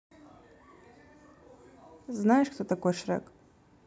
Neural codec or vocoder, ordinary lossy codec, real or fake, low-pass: none; none; real; none